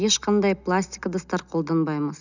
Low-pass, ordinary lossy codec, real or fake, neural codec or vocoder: 7.2 kHz; none; real; none